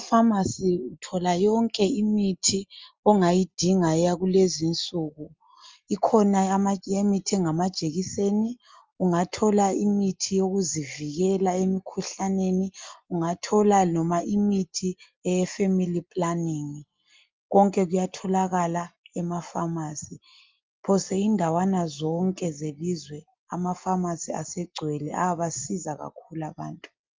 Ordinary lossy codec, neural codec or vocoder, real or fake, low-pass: Opus, 32 kbps; none; real; 7.2 kHz